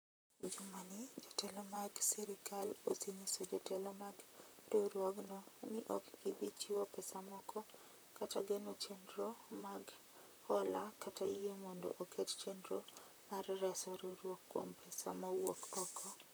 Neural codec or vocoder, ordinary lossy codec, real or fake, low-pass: vocoder, 44.1 kHz, 128 mel bands, Pupu-Vocoder; none; fake; none